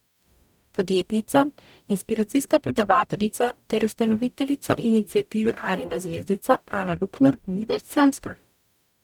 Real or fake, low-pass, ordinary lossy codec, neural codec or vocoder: fake; 19.8 kHz; none; codec, 44.1 kHz, 0.9 kbps, DAC